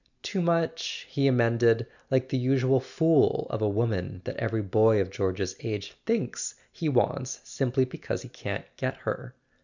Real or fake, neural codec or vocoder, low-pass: real; none; 7.2 kHz